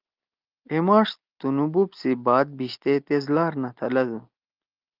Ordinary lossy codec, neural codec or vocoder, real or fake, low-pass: Opus, 24 kbps; none; real; 5.4 kHz